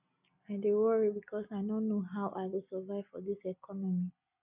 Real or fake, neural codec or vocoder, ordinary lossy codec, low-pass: real; none; none; 3.6 kHz